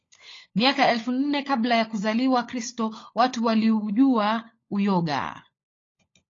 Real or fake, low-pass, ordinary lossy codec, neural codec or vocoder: fake; 7.2 kHz; AAC, 32 kbps; codec, 16 kHz, 16 kbps, FunCodec, trained on LibriTTS, 50 frames a second